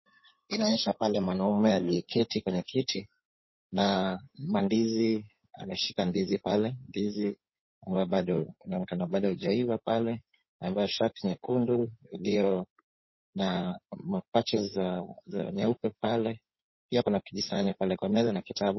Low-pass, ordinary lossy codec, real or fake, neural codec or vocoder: 7.2 kHz; MP3, 24 kbps; fake; codec, 16 kHz in and 24 kHz out, 2.2 kbps, FireRedTTS-2 codec